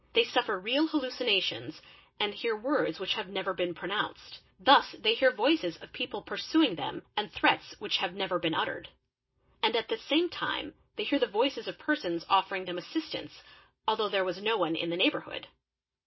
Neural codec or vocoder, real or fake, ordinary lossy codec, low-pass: none; real; MP3, 24 kbps; 7.2 kHz